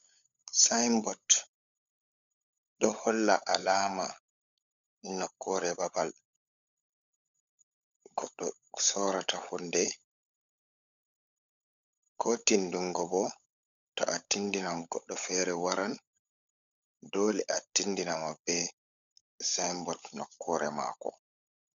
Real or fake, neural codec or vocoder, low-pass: fake; codec, 16 kHz, 16 kbps, FunCodec, trained on LibriTTS, 50 frames a second; 7.2 kHz